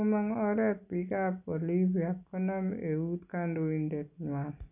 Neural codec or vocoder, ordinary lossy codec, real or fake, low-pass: none; none; real; 3.6 kHz